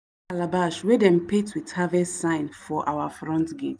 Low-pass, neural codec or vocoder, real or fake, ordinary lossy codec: none; none; real; none